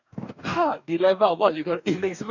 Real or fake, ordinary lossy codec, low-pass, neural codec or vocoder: fake; none; 7.2 kHz; codec, 44.1 kHz, 2.6 kbps, DAC